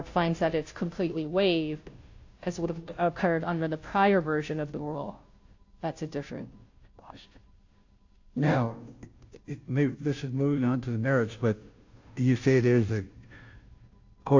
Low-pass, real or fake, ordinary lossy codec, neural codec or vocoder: 7.2 kHz; fake; AAC, 48 kbps; codec, 16 kHz, 0.5 kbps, FunCodec, trained on Chinese and English, 25 frames a second